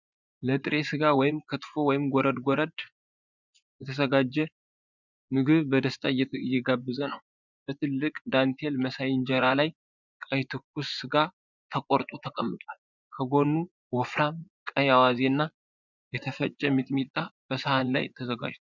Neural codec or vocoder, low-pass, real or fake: vocoder, 24 kHz, 100 mel bands, Vocos; 7.2 kHz; fake